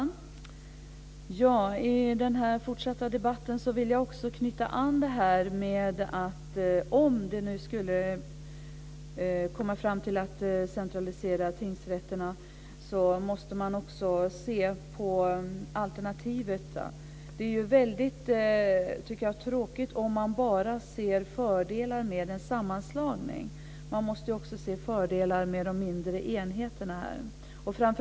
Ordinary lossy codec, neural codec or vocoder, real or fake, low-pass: none; none; real; none